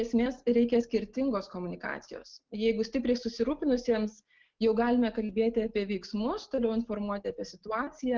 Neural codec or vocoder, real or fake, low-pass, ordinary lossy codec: none; real; 7.2 kHz; Opus, 16 kbps